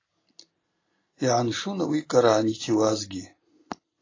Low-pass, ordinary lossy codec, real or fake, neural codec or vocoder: 7.2 kHz; AAC, 32 kbps; real; none